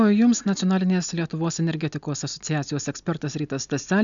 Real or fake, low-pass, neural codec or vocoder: real; 7.2 kHz; none